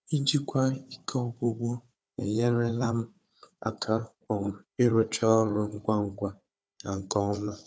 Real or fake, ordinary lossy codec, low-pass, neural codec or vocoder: fake; none; none; codec, 16 kHz, 4 kbps, FunCodec, trained on Chinese and English, 50 frames a second